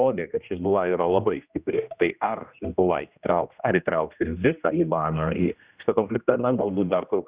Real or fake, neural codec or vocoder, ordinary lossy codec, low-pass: fake; codec, 16 kHz, 1 kbps, X-Codec, HuBERT features, trained on general audio; Opus, 64 kbps; 3.6 kHz